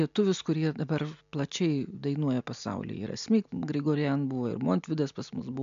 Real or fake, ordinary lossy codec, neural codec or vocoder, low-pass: real; MP3, 64 kbps; none; 7.2 kHz